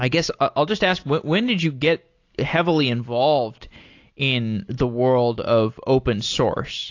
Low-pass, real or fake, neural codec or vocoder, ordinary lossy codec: 7.2 kHz; real; none; AAC, 48 kbps